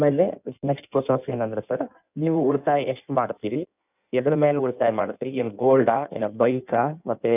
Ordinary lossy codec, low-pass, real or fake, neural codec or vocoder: none; 3.6 kHz; fake; codec, 16 kHz in and 24 kHz out, 1.1 kbps, FireRedTTS-2 codec